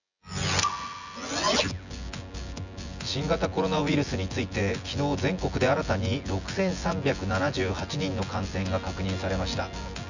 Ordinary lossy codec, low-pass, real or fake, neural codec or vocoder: none; 7.2 kHz; fake; vocoder, 24 kHz, 100 mel bands, Vocos